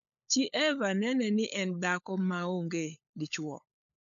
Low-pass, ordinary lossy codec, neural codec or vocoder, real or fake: 7.2 kHz; none; codec, 16 kHz, 16 kbps, FunCodec, trained on LibriTTS, 50 frames a second; fake